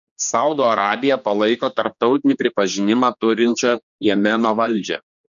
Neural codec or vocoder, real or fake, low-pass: codec, 16 kHz, 2 kbps, X-Codec, HuBERT features, trained on general audio; fake; 7.2 kHz